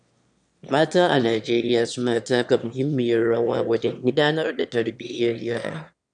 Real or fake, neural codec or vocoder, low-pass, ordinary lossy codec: fake; autoencoder, 22.05 kHz, a latent of 192 numbers a frame, VITS, trained on one speaker; 9.9 kHz; none